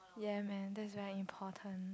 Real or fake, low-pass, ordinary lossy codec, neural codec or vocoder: real; none; none; none